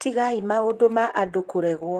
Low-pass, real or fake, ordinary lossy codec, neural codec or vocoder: 14.4 kHz; fake; Opus, 16 kbps; vocoder, 44.1 kHz, 128 mel bands, Pupu-Vocoder